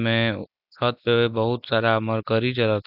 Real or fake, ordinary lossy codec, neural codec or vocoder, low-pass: real; none; none; 5.4 kHz